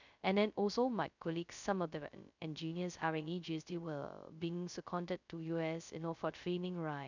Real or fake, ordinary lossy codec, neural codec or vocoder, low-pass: fake; none; codec, 16 kHz, 0.2 kbps, FocalCodec; 7.2 kHz